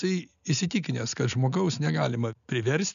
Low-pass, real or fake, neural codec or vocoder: 7.2 kHz; real; none